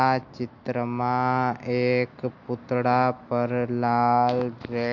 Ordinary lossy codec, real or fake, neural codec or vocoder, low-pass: MP3, 48 kbps; real; none; 7.2 kHz